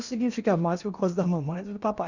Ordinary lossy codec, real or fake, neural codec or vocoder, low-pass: none; fake; codec, 16 kHz in and 24 kHz out, 0.8 kbps, FocalCodec, streaming, 65536 codes; 7.2 kHz